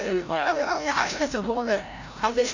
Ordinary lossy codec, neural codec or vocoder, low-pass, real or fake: none; codec, 16 kHz, 0.5 kbps, FreqCodec, larger model; 7.2 kHz; fake